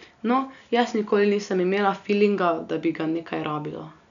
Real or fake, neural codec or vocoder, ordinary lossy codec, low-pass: real; none; none; 7.2 kHz